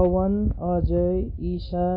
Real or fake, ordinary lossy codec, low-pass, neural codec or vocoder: real; MP3, 24 kbps; 5.4 kHz; none